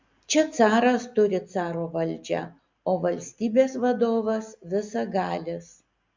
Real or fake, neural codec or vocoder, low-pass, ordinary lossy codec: real; none; 7.2 kHz; MP3, 64 kbps